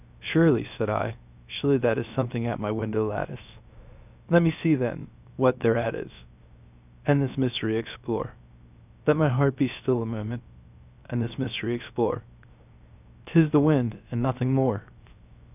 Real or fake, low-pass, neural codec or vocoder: fake; 3.6 kHz; codec, 16 kHz, 0.7 kbps, FocalCodec